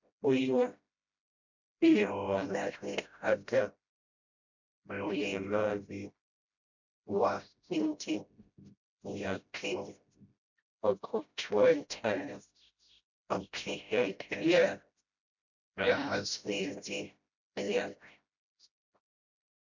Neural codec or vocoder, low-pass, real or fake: codec, 16 kHz, 0.5 kbps, FreqCodec, smaller model; 7.2 kHz; fake